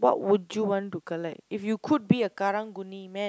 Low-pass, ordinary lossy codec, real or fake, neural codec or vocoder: none; none; real; none